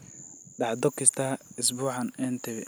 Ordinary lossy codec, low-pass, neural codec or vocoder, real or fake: none; none; none; real